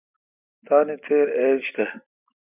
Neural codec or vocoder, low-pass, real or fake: none; 3.6 kHz; real